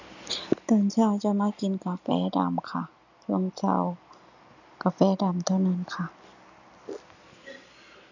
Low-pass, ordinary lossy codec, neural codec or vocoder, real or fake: 7.2 kHz; none; none; real